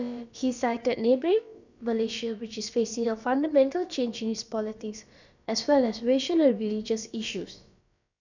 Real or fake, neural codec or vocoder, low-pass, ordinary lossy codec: fake; codec, 16 kHz, about 1 kbps, DyCAST, with the encoder's durations; 7.2 kHz; none